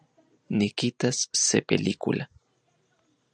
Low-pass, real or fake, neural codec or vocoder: 9.9 kHz; real; none